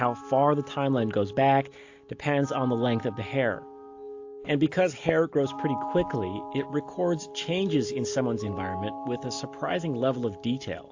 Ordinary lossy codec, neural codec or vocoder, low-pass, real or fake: AAC, 48 kbps; none; 7.2 kHz; real